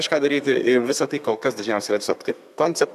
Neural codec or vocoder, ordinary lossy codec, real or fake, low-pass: codec, 32 kHz, 1.9 kbps, SNAC; AAC, 96 kbps; fake; 14.4 kHz